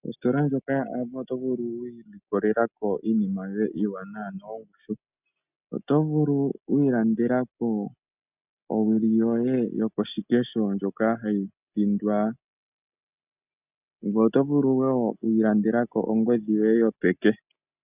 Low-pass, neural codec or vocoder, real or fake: 3.6 kHz; none; real